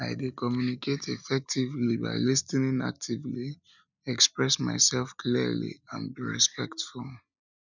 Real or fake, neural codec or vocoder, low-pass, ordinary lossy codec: real; none; 7.2 kHz; none